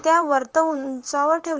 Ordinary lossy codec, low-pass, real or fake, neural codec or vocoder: Opus, 24 kbps; 7.2 kHz; fake; vocoder, 44.1 kHz, 128 mel bands, Pupu-Vocoder